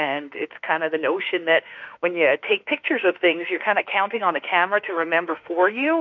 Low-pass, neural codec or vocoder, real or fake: 7.2 kHz; autoencoder, 48 kHz, 32 numbers a frame, DAC-VAE, trained on Japanese speech; fake